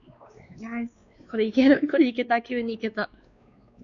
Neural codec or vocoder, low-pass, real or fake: codec, 16 kHz, 2 kbps, X-Codec, WavLM features, trained on Multilingual LibriSpeech; 7.2 kHz; fake